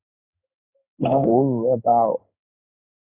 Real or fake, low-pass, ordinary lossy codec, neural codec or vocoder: fake; 3.6 kHz; MP3, 24 kbps; codec, 16 kHz in and 24 kHz out, 1 kbps, XY-Tokenizer